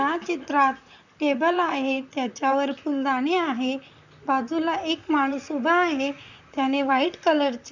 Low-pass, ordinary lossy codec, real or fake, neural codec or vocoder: 7.2 kHz; none; fake; vocoder, 44.1 kHz, 128 mel bands, Pupu-Vocoder